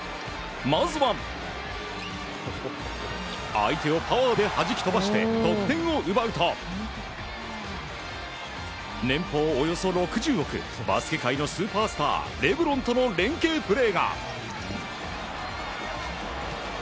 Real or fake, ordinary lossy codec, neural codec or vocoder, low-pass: real; none; none; none